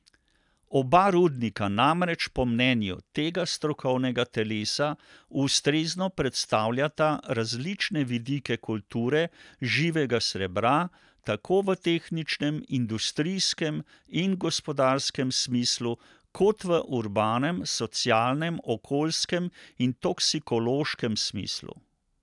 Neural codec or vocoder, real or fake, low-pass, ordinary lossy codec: none; real; 10.8 kHz; none